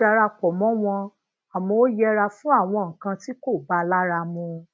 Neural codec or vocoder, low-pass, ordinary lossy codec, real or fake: none; none; none; real